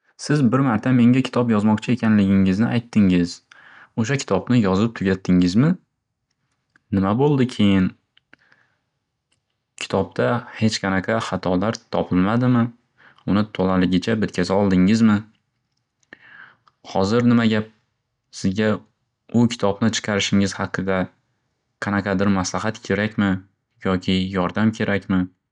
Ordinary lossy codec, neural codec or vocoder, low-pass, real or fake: none; none; 9.9 kHz; real